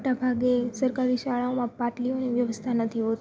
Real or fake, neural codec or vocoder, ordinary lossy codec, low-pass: real; none; none; none